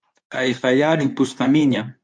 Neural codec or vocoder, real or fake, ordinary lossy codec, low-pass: codec, 24 kHz, 0.9 kbps, WavTokenizer, medium speech release version 2; fake; MP3, 64 kbps; 9.9 kHz